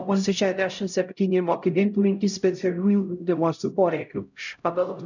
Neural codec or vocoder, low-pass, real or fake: codec, 16 kHz, 0.5 kbps, X-Codec, HuBERT features, trained on LibriSpeech; 7.2 kHz; fake